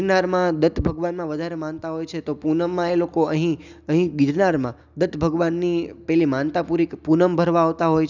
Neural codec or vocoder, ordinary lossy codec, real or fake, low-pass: none; none; real; 7.2 kHz